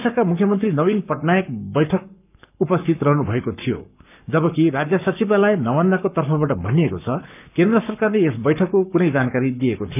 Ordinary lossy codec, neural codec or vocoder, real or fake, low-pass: none; codec, 44.1 kHz, 7.8 kbps, Pupu-Codec; fake; 3.6 kHz